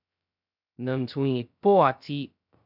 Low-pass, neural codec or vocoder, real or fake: 5.4 kHz; codec, 16 kHz, 0.2 kbps, FocalCodec; fake